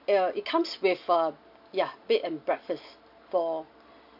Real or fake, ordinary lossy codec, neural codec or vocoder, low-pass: real; AAC, 48 kbps; none; 5.4 kHz